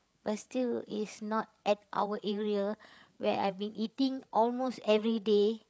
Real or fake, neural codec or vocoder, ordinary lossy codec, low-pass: fake; codec, 16 kHz, 8 kbps, FreqCodec, larger model; none; none